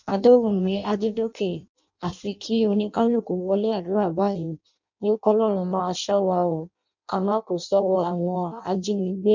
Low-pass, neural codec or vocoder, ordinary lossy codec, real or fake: 7.2 kHz; codec, 16 kHz in and 24 kHz out, 0.6 kbps, FireRedTTS-2 codec; MP3, 64 kbps; fake